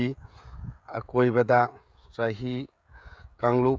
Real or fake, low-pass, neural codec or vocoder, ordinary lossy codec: fake; none; codec, 16 kHz, 16 kbps, FreqCodec, smaller model; none